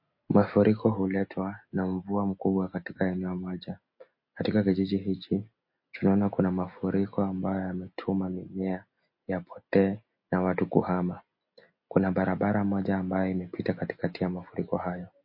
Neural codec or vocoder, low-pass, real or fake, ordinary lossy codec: none; 5.4 kHz; real; MP3, 32 kbps